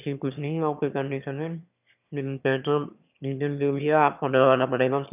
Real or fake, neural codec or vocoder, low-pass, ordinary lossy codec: fake; autoencoder, 22.05 kHz, a latent of 192 numbers a frame, VITS, trained on one speaker; 3.6 kHz; none